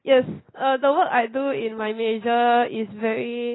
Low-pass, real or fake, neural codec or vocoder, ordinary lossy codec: 7.2 kHz; real; none; AAC, 16 kbps